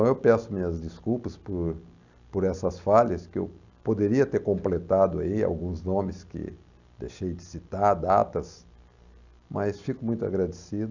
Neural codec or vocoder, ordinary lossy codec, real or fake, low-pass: none; none; real; 7.2 kHz